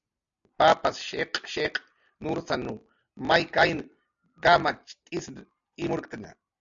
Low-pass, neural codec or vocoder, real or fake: 7.2 kHz; none; real